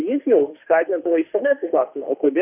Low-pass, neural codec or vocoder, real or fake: 3.6 kHz; autoencoder, 48 kHz, 32 numbers a frame, DAC-VAE, trained on Japanese speech; fake